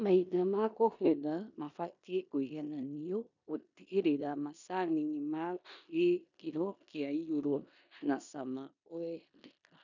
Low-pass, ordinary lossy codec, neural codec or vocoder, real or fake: 7.2 kHz; none; codec, 16 kHz in and 24 kHz out, 0.9 kbps, LongCat-Audio-Codec, four codebook decoder; fake